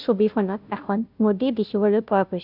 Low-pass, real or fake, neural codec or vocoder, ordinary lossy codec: 5.4 kHz; fake; codec, 16 kHz, 0.5 kbps, FunCodec, trained on Chinese and English, 25 frames a second; none